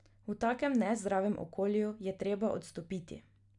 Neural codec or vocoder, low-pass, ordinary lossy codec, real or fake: none; 10.8 kHz; none; real